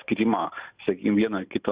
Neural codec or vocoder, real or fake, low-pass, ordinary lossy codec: none; real; 3.6 kHz; Opus, 64 kbps